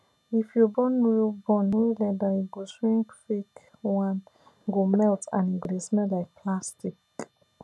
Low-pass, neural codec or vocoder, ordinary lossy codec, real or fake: none; none; none; real